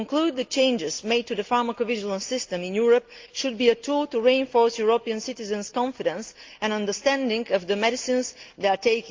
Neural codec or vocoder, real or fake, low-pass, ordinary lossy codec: none; real; 7.2 kHz; Opus, 32 kbps